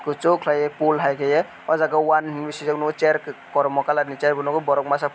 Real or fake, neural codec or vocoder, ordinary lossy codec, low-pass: real; none; none; none